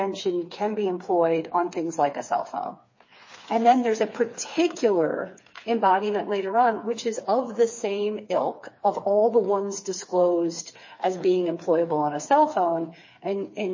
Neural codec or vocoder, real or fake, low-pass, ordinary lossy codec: codec, 16 kHz, 4 kbps, FreqCodec, smaller model; fake; 7.2 kHz; MP3, 32 kbps